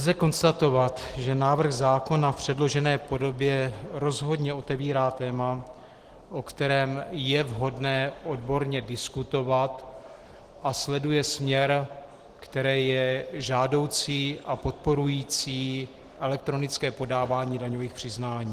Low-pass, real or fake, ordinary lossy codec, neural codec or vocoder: 14.4 kHz; real; Opus, 16 kbps; none